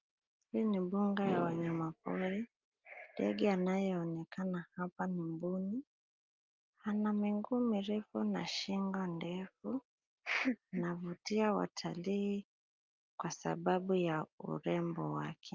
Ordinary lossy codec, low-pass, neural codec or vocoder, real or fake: Opus, 24 kbps; 7.2 kHz; none; real